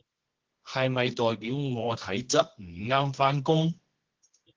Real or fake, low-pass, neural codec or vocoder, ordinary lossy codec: fake; 7.2 kHz; codec, 24 kHz, 0.9 kbps, WavTokenizer, medium music audio release; Opus, 16 kbps